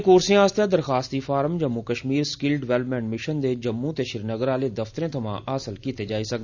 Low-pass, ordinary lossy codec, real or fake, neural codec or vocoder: 7.2 kHz; none; real; none